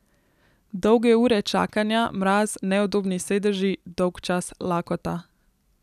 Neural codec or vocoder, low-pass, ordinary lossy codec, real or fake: none; 14.4 kHz; none; real